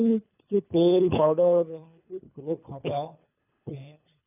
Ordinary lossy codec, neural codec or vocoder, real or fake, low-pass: none; codec, 24 kHz, 3 kbps, HILCodec; fake; 3.6 kHz